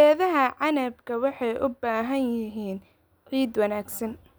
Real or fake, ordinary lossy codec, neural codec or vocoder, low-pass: real; none; none; none